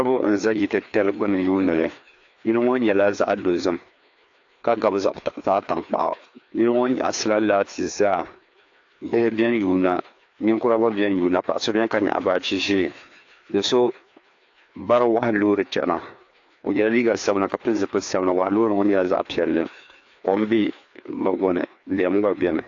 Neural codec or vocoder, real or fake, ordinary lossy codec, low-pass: codec, 16 kHz, 2 kbps, FreqCodec, larger model; fake; AAC, 48 kbps; 7.2 kHz